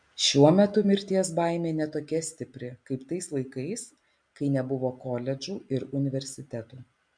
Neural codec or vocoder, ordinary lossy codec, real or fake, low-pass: none; MP3, 64 kbps; real; 9.9 kHz